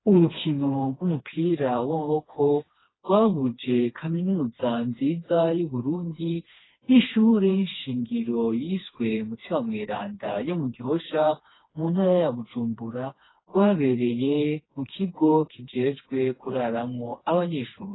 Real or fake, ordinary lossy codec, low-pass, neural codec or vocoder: fake; AAC, 16 kbps; 7.2 kHz; codec, 16 kHz, 2 kbps, FreqCodec, smaller model